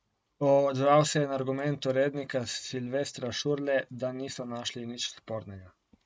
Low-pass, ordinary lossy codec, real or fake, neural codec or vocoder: none; none; real; none